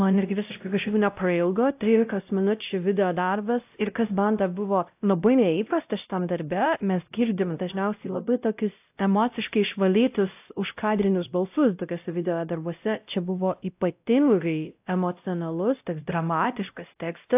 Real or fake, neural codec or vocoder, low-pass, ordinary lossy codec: fake; codec, 16 kHz, 0.5 kbps, X-Codec, WavLM features, trained on Multilingual LibriSpeech; 3.6 kHz; AAC, 32 kbps